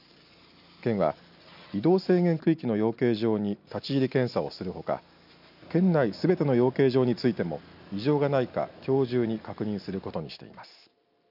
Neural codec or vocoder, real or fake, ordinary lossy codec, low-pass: none; real; none; 5.4 kHz